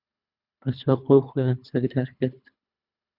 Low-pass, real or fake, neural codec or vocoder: 5.4 kHz; fake; codec, 24 kHz, 3 kbps, HILCodec